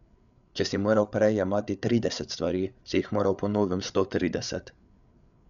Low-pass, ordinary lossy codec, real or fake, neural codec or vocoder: 7.2 kHz; none; fake; codec, 16 kHz, 8 kbps, FreqCodec, larger model